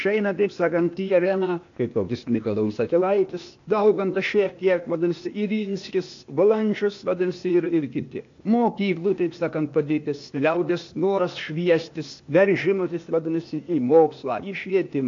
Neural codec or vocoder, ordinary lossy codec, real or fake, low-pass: codec, 16 kHz, 0.8 kbps, ZipCodec; AAC, 48 kbps; fake; 7.2 kHz